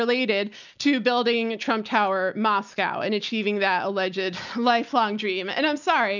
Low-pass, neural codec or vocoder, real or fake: 7.2 kHz; none; real